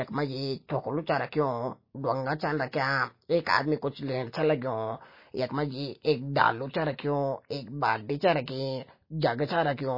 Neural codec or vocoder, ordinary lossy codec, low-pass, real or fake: none; MP3, 24 kbps; 5.4 kHz; real